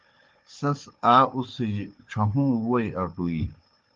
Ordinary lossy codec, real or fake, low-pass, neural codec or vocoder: Opus, 32 kbps; fake; 7.2 kHz; codec, 16 kHz, 4 kbps, FunCodec, trained on Chinese and English, 50 frames a second